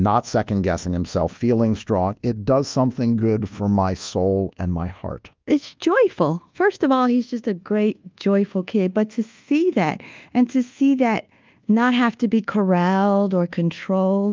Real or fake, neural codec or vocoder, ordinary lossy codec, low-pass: fake; codec, 24 kHz, 1.2 kbps, DualCodec; Opus, 24 kbps; 7.2 kHz